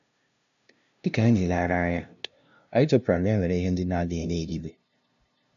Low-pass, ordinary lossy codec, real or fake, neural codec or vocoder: 7.2 kHz; none; fake; codec, 16 kHz, 0.5 kbps, FunCodec, trained on LibriTTS, 25 frames a second